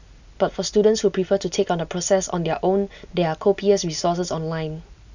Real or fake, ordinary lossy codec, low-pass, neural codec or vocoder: real; none; 7.2 kHz; none